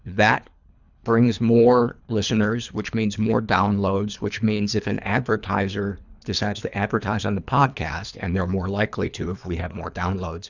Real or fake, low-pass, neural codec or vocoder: fake; 7.2 kHz; codec, 24 kHz, 3 kbps, HILCodec